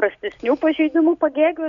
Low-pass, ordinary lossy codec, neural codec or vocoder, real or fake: 7.2 kHz; MP3, 96 kbps; none; real